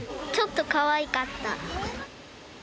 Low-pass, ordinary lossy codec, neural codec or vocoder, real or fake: none; none; none; real